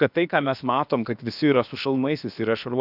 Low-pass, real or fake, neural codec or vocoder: 5.4 kHz; fake; codec, 16 kHz, about 1 kbps, DyCAST, with the encoder's durations